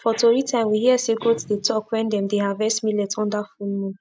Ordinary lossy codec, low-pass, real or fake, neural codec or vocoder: none; none; real; none